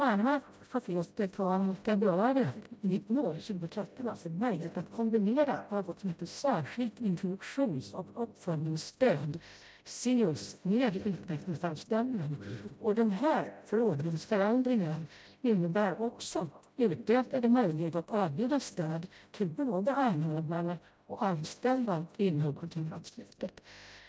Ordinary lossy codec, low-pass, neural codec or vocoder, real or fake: none; none; codec, 16 kHz, 0.5 kbps, FreqCodec, smaller model; fake